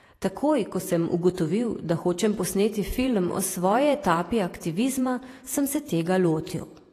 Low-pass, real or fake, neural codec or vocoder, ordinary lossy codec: 14.4 kHz; fake; vocoder, 48 kHz, 128 mel bands, Vocos; AAC, 48 kbps